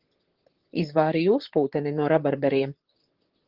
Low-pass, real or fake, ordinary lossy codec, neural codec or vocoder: 5.4 kHz; fake; Opus, 16 kbps; vocoder, 44.1 kHz, 128 mel bands, Pupu-Vocoder